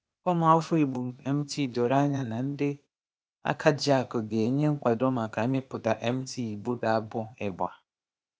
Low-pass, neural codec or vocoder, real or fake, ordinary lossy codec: none; codec, 16 kHz, 0.8 kbps, ZipCodec; fake; none